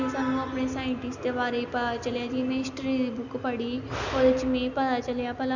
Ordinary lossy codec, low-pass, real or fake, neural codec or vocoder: none; 7.2 kHz; real; none